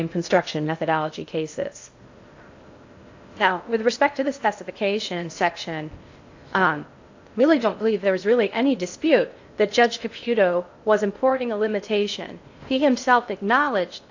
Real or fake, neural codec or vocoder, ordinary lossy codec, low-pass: fake; codec, 16 kHz in and 24 kHz out, 0.6 kbps, FocalCodec, streaming, 2048 codes; AAC, 48 kbps; 7.2 kHz